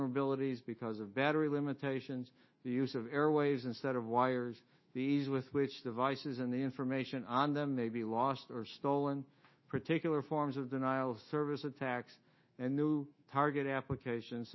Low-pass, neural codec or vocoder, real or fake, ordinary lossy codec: 7.2 kHz; autoencoder, 48 kHz, 128 numbers a frame, DAC-VAE, trained on Japanese speech; fake; MP3, 24 kbps